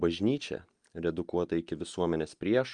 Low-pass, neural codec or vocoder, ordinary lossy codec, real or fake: 9.9 kHz; none; Opus, 32 kbps; real